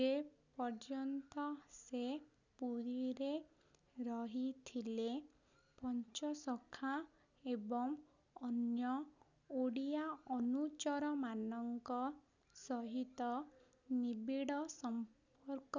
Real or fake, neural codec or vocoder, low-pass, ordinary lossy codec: real; none; 7.2 kHz; none